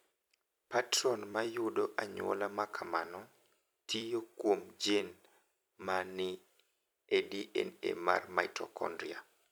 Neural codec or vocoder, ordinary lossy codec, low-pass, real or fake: vocoder, 44.1 kHz, 128 mel bands every 256 samples, BigVGAN v2; none; none; fake